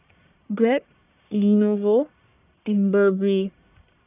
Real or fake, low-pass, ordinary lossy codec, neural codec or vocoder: fake; 3.6 kHz; none; codec, 44.1 kHz, 1.7 kbps, Pupu-Codec